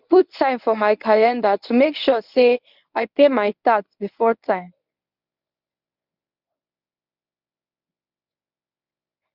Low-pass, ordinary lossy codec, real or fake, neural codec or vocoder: 5.4 kHz; none; fake; codec, 24 kHz, 0.9 kbps, WavTokenizer, medium speech release version 1